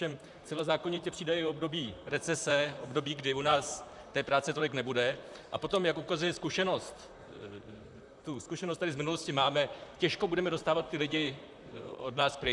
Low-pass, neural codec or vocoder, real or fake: 10.8 kHz; vocoder, 44.1 kHz, 128 mel bands, Pupu-Vocoder; fake